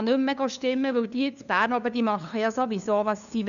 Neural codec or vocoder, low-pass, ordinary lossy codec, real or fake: codec, 16 kHz, 2 kbps, FunCodec, trained on LibriTTS, 25 frames a second; 7.2 kHz; none; fake